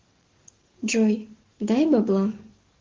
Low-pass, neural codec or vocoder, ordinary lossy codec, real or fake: 7.2 kHz; codec, 44.1 kHz, 7.8 kbps, DAC; Opus, 16 kbps; fake